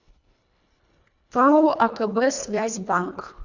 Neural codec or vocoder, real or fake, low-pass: codec, 24 kHz, 1.5 kbps, HILCodec; fake; 7.2 kHz